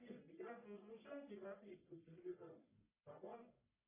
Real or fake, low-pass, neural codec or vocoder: fake; 3.6 kHz; codec, 44.1 kHz, 1.7 kbps, Pupu-Codec